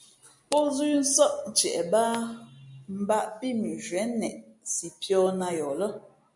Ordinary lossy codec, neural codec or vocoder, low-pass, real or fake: MP3, 64 kbps; none; 10.8 kHz; real